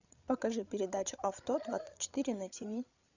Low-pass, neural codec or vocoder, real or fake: 7.2 kHz; codec, 16 kHz, 16 kbps, FreqCodec, larger model; fake